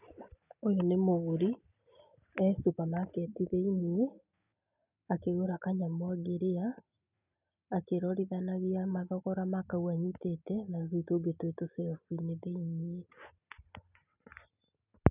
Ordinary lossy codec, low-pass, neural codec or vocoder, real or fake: none; 3.6 kHz; none; real